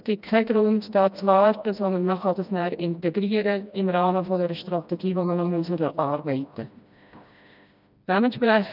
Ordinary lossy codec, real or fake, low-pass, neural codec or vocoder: none; fake; 5.4 kHz; codec, 16 kHz, 1 kbps, FreqCodec, smaller model